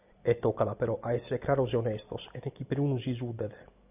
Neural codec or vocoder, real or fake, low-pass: none; real; 3.6 kHz